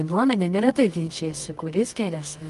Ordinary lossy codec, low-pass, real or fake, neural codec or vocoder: Opus, 32 kbps; 10.8 kHz; fake; codec, 24 kHz, 0.9 kbps, WavTokenizer, medium music audio release